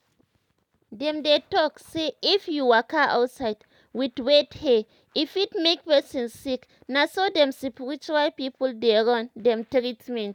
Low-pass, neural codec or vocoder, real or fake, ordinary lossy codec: 19.8 kHz; none; real; none